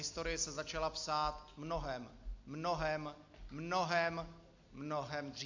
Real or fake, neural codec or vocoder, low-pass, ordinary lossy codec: real; none; 7.2 kHz; AAC, 48 kbps